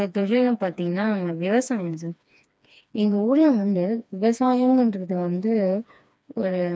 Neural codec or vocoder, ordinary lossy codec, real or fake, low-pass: codec, 16 kHz, 2 kbps, FreqCodec, smaller model; none; fake; none